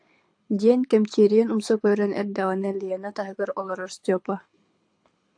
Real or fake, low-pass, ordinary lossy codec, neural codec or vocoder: fake; 9.9 kHz; AAC, 64 kbps; codec, 24 kHz, 6 kbps, HILCodec